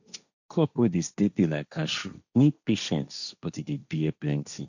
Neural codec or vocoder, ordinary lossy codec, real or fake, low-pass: codec, 16 kHz, 1.1 kbps, Voila-Tokenizer; none; fake; 7.2 kHz